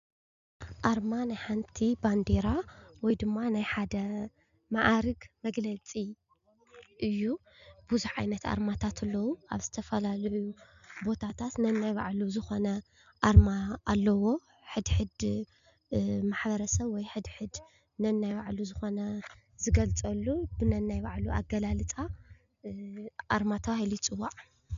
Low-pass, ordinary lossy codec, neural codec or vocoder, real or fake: 7.2 kHz; MP3, 96 kbps; none; real